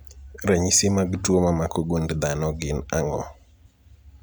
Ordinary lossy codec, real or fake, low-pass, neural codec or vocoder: none; real; none; none